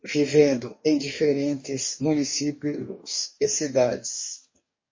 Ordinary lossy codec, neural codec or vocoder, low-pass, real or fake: MP3, 32 kbps; codec, 44.1 kHz, 2.6 kbps, DAC; 7.2 kHz; fake